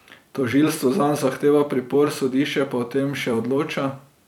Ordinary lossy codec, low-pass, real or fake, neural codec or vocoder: none; 19.8 kHz; fake; vocoder, 44.1 kHz, 128 mel bands every 256 samples, BigVGAN v2